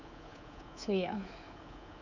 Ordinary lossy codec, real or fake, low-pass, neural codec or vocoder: none; fake; 7.2 kHz; codec, 24 kHz, 3.1 kbps, DualCodec